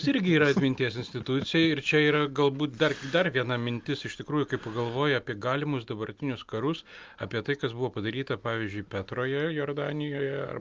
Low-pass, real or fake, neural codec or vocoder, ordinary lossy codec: 7.2 kHz; real; none; Opus, 24 kbps